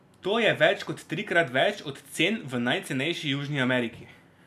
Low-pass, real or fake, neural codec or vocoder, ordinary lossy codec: 14.4 kHz; real; none; none